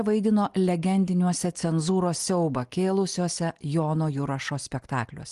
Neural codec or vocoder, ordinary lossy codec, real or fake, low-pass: none; Opus, 24 kbps; real; 10.8 kHz